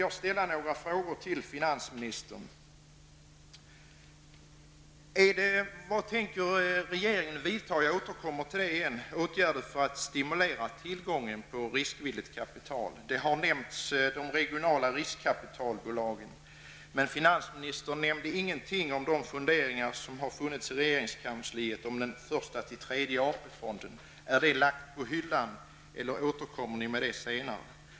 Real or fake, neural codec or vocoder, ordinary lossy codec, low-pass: real; none; none; none